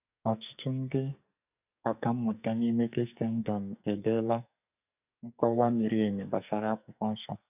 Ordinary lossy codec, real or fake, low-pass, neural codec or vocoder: none; fake; 3.6 kHz; codec, 44.1 kHz, 2.6 kbps, SNAC